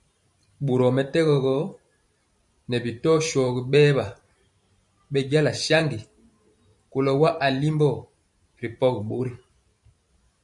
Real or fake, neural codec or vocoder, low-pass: fake; vocoder, 44.1 kHz, 128 mel bands every 512 samples, BigVGAN v2; 10.8 kHz